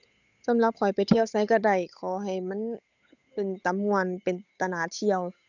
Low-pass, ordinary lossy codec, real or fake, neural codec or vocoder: 7.2 kHz; none; fake; codec, 16 kHz, 8 kbps, FunCodec, trained on Chinese and English, 25 frames a second